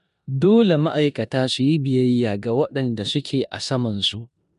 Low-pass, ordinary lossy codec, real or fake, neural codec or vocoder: 10.8 kHz; MP3, 96 kbps; fake; codec, 16 kHz in and 24 kHz out, 0.9 kbps, LongCat-Audio-Codec, four codebook decoder